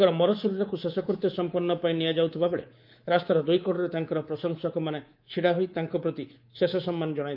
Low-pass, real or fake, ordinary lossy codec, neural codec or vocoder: 5.4 kHz; fake; Opus, 32 kbps; codec, 24 kHz, 3.1 kbps, DualCodec